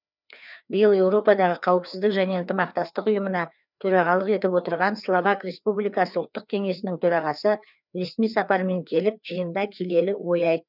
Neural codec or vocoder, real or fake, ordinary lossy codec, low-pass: codec, 16 kHz, 2 kbps, FreqCodec, larger model; fake; none; 5.4 kHz